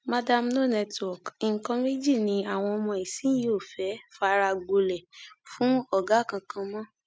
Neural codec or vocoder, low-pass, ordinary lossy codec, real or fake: none; none; none; real